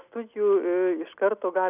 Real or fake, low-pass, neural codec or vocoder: real; 3.6 kHz; none